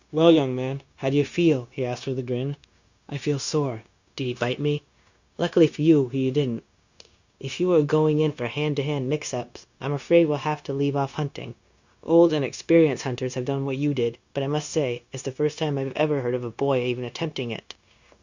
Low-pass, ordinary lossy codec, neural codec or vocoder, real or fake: 7.2 kHz; Opus, 64 kbps; codec, 16 kHz, 0.9 kbps, LongCat-Audio-Codec; fake